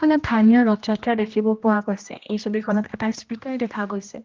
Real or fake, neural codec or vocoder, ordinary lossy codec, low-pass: fake; codec, 16 kHz, 1 kbps, X-Codec, HuBERT features, trained on general audio; Opus, 24 kbps; 7.2 kHz